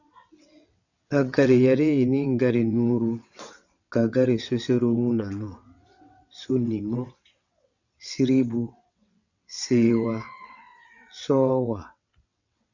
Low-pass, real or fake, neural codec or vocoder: 7.2 kHz; fake; vocoder, 22.05 kHz, 80 mel bands, WaveNeXt